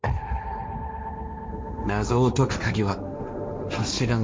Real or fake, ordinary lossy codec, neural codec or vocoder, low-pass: fake; none; codec, 16 kHz, 1.1 kbps, Voila-Tokenizer; none